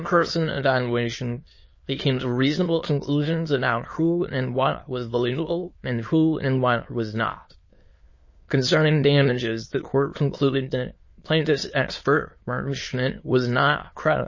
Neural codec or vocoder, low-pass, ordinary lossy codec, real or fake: autoencoder, 22.05 kHz, a latent of 192 numbers a frame, VITS, trained on many speakers; 7.2 kHz; MP3, 32 kbps; fake